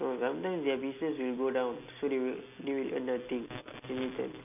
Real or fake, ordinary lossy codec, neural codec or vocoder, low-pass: real; AAC, 32 kbps; none; 3.6 kHz